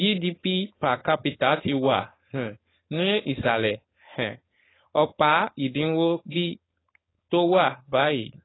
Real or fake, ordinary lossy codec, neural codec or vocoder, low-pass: fake; AAC, 16 kbps; codec, 16 kHz, 4.8 kbps, FACodec; 7.2 kHz